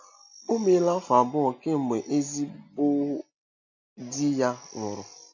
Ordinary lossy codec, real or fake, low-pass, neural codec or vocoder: AAC, 48 kbps; real; 7.2 kHz; none